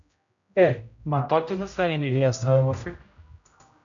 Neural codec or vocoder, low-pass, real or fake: codec, 16 kHz, 0.5 kbps, X-Codec, HuBERT features, trained on general audio; 7.2 kHz; fake